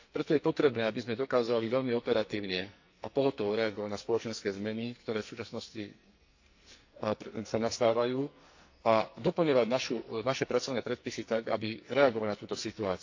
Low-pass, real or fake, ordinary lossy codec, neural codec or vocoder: 7.2 kHz; fake; AAC, 48 kbps; codec, 44.1 kHz, 2.6 kbps, SNAC